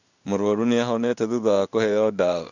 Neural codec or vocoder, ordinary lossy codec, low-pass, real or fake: codec, 16 kHz in and 24 kHz out, 1 kbps, XY-Tokenizer; none; 7.2 kHz; fake